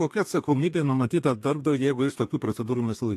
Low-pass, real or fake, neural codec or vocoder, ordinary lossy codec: 14.4 kHz; fake; codec, 32 kHz, 1.9 kbps, SNAC; AAC, 64 kbps